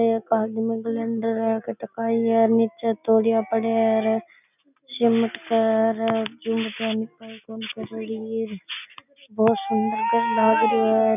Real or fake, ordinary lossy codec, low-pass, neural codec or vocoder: real; none; 3.6 kHz; none